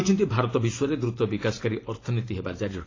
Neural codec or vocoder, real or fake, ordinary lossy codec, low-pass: vocoder, 44.1 kHz, 128 mel bands every 512 samples, BigVGAN v2; fake; AAC, 32 kbps; 7.2 kHz